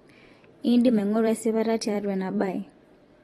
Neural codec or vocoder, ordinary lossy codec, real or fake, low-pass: vocoder, 44.1 kHz, 128 mel bands every 256 samples, BigVGAN v2; AAC, 32 kbps; fake; 19.8 kHz